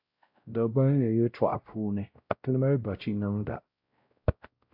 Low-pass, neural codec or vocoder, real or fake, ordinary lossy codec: 5.4 kHz; codec, 16 kHz, 0.5 kbps, X-Codec, WavLM features, trained on Multilingual LibriSpeech; fake; AAC, 48 kbps